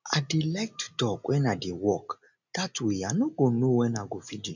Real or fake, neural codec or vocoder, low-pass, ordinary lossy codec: real; none; 7.2 kHz; none